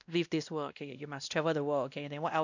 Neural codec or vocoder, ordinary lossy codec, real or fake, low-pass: codec, 16 kHz, 1 kbps, X-Codec, WavLM features, trained on Multilingual LibriSpeech; none; fake; 7.2 kHz